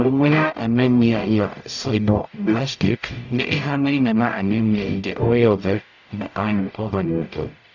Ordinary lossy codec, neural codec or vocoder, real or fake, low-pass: none; codec, 44.1 kHz, 0.9 kbps, DAC; fake; 7.2 kHz